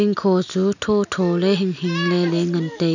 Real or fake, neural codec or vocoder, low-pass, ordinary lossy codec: real; none; 7.2 kHz; none